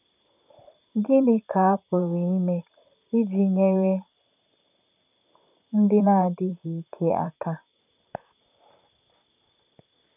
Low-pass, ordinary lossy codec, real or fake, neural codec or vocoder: 3.6 kHz; none; fake; vocoder, 44.1 kHz, 128 mel bands, Pupu-Vocoder